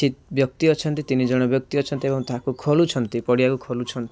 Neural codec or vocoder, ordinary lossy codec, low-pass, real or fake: none; none; none; real